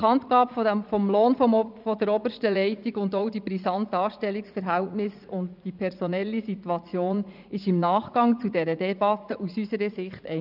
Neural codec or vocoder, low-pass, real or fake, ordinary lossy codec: vocoder, 44.1 kHz, 80 mel bands, Vocos; 5.4 kHz; fake; none